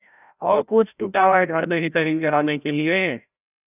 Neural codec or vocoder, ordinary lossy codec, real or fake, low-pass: codec, 16 kHz, 0.5 kbps, FreqCodec, larger model; none; fake; 3.6 kHz